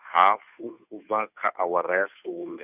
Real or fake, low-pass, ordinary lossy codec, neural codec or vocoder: fake; 3.6 kHz; none; codec, 16 kHz, 2 kbps, FunCodec, trained on Chinese and English, 25 frames a second